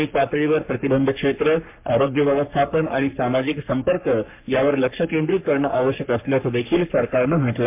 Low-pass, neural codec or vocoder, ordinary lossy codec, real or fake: 3.6 kHz; codec, 44.1 kHz, 3.4 kbps, Pupu-Codec; MP3, 32 kbps; fake